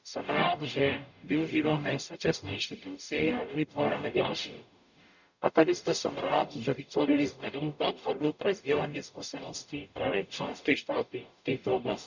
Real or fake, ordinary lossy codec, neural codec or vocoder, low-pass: fake; none; codec, 44.1 kHz, 0.9 kbps, DAC; 7.2 kHz